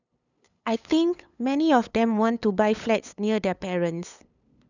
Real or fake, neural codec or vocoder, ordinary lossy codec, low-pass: fake; codec, 16 kHz, 8 kbps, FunCodec, trained on LibriTTS, 25 frames a second; none; 7.2 kHz